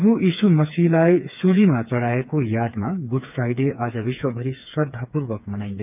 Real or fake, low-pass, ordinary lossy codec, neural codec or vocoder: fake; 3.6 kHz; none; codec, 16 kHz, 4 kbps, FreqCodec, smaller model